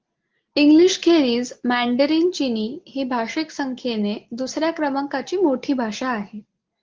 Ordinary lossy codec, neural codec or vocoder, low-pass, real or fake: Opus, 16 kbps; none; 7.2 kHz; real